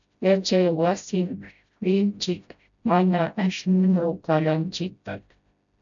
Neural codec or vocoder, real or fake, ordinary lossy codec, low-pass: codec, 16 kHz, 0.5 kbps, FreqCodec, smaller model; fake; MP3, 96 kbps; 7.2 kHz